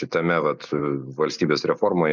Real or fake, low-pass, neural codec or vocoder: real; 7.2 kHz; none